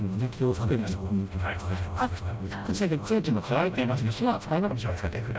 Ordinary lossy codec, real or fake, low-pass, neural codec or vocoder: none; fake; none; codec, 16 kHz, 0.5 kbps, FreqCodec, smaller model